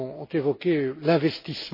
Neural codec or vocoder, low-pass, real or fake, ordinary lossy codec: none; 5.4 kHz; real; MP3, 24 kbps